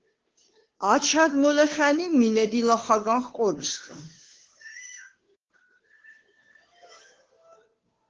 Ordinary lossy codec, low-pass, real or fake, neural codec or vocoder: Opus, 16 kbps; 7.2 kHz; fake; codec, 16 kHz, 2 kbps, FunCodec, trained on Chinese and English, 25 frames a second